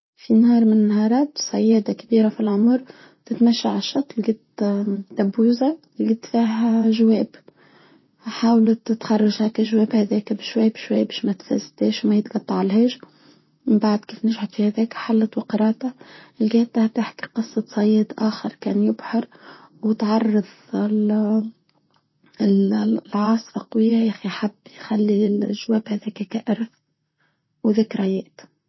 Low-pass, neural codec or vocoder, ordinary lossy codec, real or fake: 7.2 kHz; vocoder, 44.1 kHz, 128 mel bands, Pupu-Vocoder; MP3, 24 kbps; fake